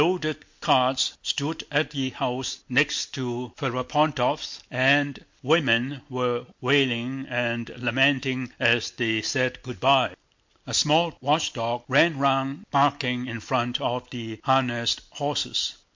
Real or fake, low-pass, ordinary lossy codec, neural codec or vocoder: real; 7.2 kHz; MP3, 48 kbps; none